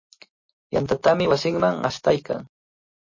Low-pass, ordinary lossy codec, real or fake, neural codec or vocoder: 7.2 kHz; MP3, 32 kbps; real; none